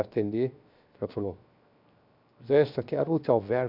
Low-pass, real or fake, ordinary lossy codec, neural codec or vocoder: 5.4 kHz; fake; none; codec, 16 kHz, 0.7 kbps, FocalCodec